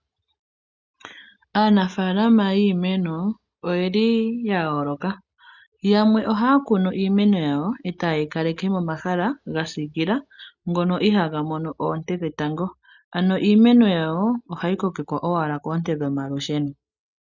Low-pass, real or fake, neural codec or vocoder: 7.2 kHz; real; none